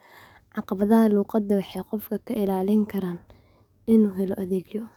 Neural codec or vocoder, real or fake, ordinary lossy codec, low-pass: codec, 44.1 kHz, 7.8 kbps, DAC; fake; none; 19.8 kHz